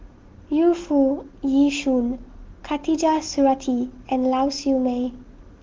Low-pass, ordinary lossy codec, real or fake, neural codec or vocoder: 7.2 kHz; Opus, 16 kbps; real; none